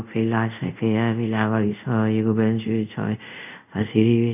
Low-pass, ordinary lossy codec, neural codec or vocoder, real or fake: 3.6 kHz; none; codec, 24 kHz, 0.5 kbps, DualCodec; fake